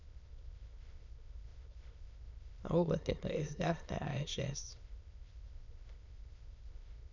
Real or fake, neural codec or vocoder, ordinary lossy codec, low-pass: fake; autoencoder, 22.05 kHz, a latent of 192 numbers a frame, VITS, trained on many speakers; none; 7.2 kHz